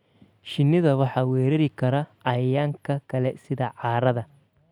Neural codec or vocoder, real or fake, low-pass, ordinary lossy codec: none; real; 19.8 kHz; none